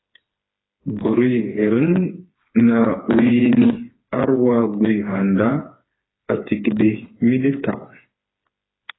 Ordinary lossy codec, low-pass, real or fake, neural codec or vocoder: AAC, 16 kbps; 7.2 kHz; fake; codec, 16 kHz, 4 kbps, FreqCodec, smaller model